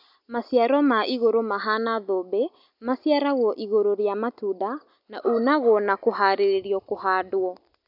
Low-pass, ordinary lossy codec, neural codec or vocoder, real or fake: 5.4 kHz; none; none; real